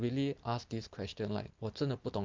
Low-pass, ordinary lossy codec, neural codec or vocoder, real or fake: 7.2 kHz; Opus, 24 kbps; none; real